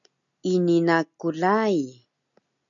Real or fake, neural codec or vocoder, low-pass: real; none; 7.2 kHz